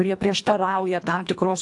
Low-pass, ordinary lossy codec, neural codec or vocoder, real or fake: 10.8 kHz; AAC, 64 kbps; codec, 24 kHz, 1.5 kbps, HILCodec; fake